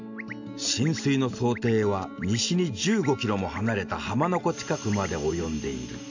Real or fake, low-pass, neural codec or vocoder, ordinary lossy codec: real; 7.2 kHz; none; AAC, 48 kbps